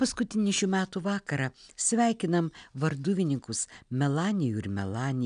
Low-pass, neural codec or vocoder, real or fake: 9.9 kHz; none; real